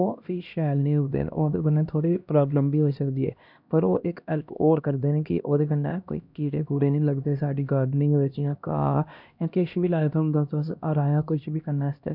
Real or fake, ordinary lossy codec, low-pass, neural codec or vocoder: fake; none; 5.4 kHz; codec, 16 kHz, 1 kbps, X-Codec, HuBERT features, trained on LibriSpeech